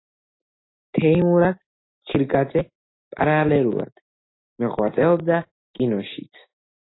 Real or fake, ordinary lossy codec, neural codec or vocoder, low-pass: real; AAC, 16 kbps; none; 7.2 kHz